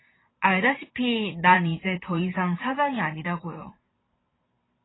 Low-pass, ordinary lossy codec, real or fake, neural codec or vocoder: 7.2 kHz; AAC, 16 kbps; fake; vocoder, 44.1 kHz, 128 mel bands every 512 samples, BigVGAN v2